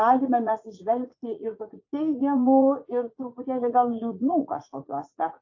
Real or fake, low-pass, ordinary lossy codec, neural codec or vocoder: fake; 7.2 kHz; AAC, 48 kbps; vocoder, 44.1 kHz, 80 mel bands, Vocos